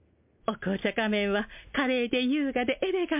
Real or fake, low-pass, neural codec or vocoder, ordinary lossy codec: real; 3.6 kHz; none; MP3, 32 kbps